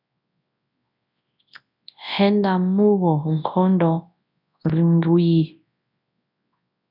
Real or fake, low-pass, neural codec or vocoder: fake; 5.4 kHz; codec, 24 kHz, 0.9 kbps, WavTokenizer, large speech release